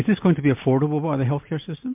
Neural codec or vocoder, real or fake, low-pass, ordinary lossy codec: none; real; 3.6 kHz; MP3, 32 kbps